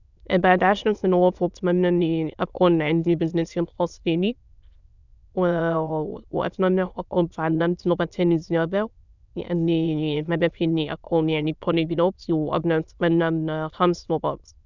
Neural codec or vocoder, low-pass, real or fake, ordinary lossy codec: autoencoder, 22.05 kHz, a latent of 192 numbers a frame, VITS, trained on many speakers; 7.2 kHz; fake; none